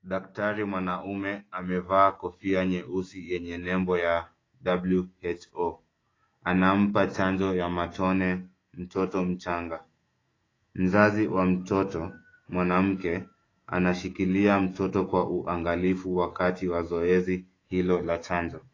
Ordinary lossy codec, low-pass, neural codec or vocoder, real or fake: AAC, 32 kbps; 7.2 kHz; autoencoder, 48 kHz, 128 numbers a frame, DAC-VAE, trained on Japanese speech; fake